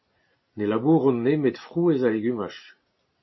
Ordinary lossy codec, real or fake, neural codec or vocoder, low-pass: MP3, 24 kbps; real; none; 7.2 kHz